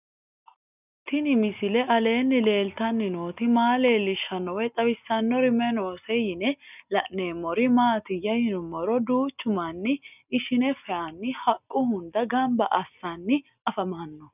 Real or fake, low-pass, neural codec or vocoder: real; 3.6 kHz; none